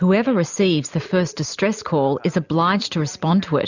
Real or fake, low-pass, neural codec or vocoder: real; 7.2 kHz; none